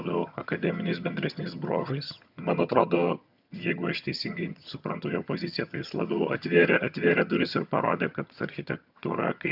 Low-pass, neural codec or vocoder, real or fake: 5.4 kHz; vocoder, 22.05 kHz, 80 mel bands, HiFi-GAN; fake